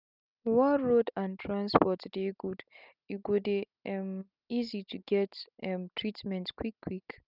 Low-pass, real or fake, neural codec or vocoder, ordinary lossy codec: 5.4 kHz; real; none; none